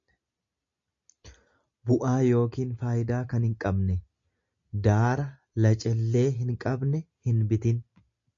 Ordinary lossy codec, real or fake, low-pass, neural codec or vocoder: MP3, 48 kbps; real; 7.2 kHz; none